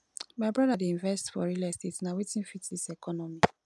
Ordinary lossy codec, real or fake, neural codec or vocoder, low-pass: none; real; none; none